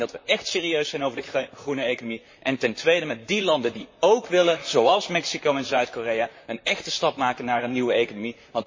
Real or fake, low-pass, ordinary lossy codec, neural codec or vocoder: fake; 7.2 kHz; MP3, 32 kbps; vocoder, 44.1 kHz, 128 mel bands, Pupu-Vocoder